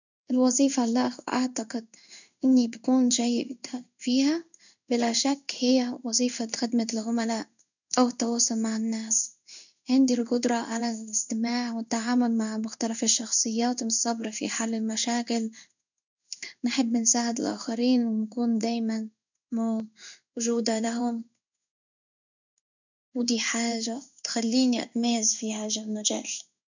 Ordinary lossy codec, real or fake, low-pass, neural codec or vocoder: none; fake; 7.2 kHz; codec, 16 kHz in and 24 kHz out, 1 kbps, XY-Tokenizer